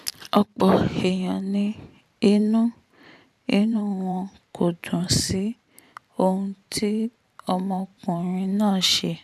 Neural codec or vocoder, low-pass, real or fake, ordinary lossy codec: vocoder, 44.1 kHz, 128 mel bands every 512 samples, BigVGAN v2; 14.4 kHz; fake; none